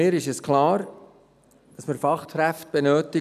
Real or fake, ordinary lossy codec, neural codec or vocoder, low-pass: real; none; none; 14.4 kHz